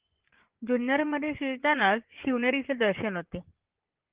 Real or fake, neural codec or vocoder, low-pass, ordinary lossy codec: fake; vocoder, 24 kHz, 100 mel bands, Vocos; 3.6 kHz; Opus, 16 kbps